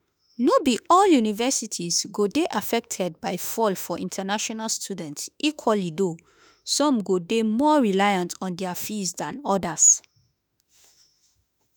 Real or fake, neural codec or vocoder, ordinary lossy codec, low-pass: fake; autoencoder, 48 kHz, 32 numbers a frame, DAC-VAE, trained on Japanese speech; none; none